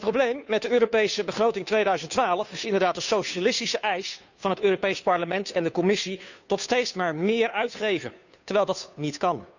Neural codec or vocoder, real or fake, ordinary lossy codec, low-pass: codec, 16 kHz, 2 kbps, FunCodec, trained on Chinese and English, 25 frames a second; fake; none; 7.2 kHz